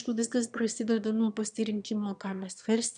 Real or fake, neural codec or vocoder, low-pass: fake; autoencoder, 22.05 kHz, a latent of 192 numbers a frame, VITS, trained on one speaker; 9.9 kHz